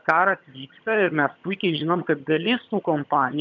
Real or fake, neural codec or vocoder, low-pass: fake; vocoder, 22.05 kHz, 80 mel bands, HiFi-GAN; 7.2 kHz